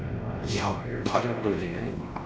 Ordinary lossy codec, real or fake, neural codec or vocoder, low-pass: none; fake; codec, 16 kHz, 1 kbps, X-Codec, WavLM features, trained on Multilingual LibriSpeech; none